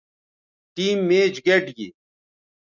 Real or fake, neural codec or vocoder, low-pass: real; none; 7.2 kHz